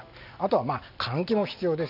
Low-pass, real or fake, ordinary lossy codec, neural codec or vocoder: 5.4 kHz; real; none; none